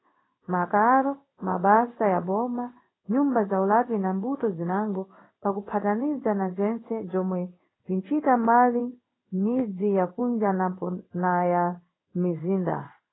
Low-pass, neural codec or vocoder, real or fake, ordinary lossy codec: 7.2 kHz; codec, 16 kHz in and 24 kHz out, 1 kbps, XY-Tokenizer; fake; AAC, 16 kbps